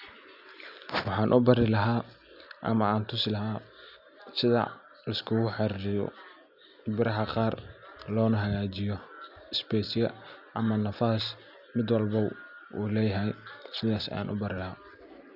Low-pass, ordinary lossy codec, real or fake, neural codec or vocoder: 5.4 kHz; none; real; none